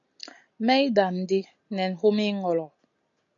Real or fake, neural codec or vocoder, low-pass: real; none; 7.2 kHz